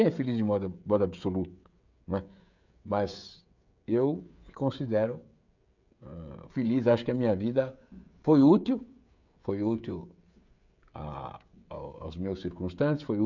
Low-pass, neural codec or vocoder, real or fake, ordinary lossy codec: 7.2 kHz; codec, 16 kHz, 16 kbps, FreqCodec, smaller model; fake; none